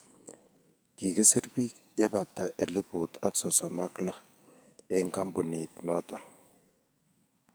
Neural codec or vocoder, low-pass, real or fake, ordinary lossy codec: codec, 44.1 kHz, 2.6 kbps, SNAC; none; fake; none